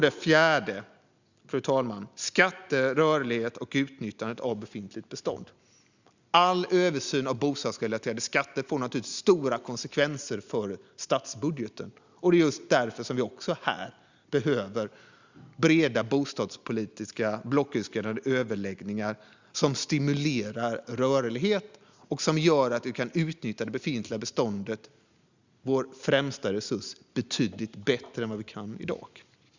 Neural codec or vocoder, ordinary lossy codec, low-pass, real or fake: none; Opus, 64 kbps; 7.2 kHz; real